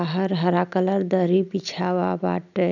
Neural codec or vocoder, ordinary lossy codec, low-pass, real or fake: none; none; 7.2 kHz; real